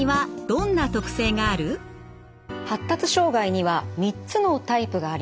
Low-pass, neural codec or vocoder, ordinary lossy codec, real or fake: none; none; none; real